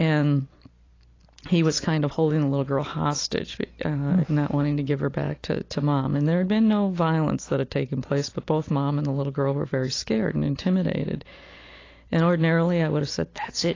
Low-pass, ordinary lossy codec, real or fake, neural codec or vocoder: 7.2 kHz; AAC, 32 kbps; real; none